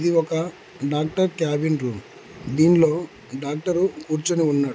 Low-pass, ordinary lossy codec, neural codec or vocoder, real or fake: none; none; none; real